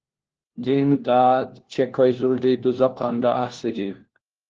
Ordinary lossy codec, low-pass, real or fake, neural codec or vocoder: Opus, 16 kbps; 7.2 kHz; fake; codec, 16 kHz, 1 kbps, FunCodec, trained on LibriTTS, 50 frames a second